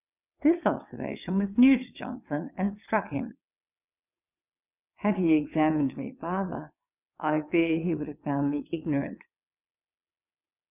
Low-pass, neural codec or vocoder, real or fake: 3.6 kHz; vocoder, 22.05 kHz, 80 mel bands, WaveNeXt; fake